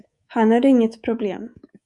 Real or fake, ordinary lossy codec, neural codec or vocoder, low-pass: fake; Opus, 64 kbps; codec, 24 kHz, 3.1 kbps, DualCodec; 10.8 kHz